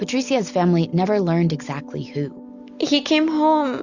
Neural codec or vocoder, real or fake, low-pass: none; real; 7.2 kHz